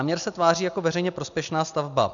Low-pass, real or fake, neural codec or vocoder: 7.2 kHz; real; none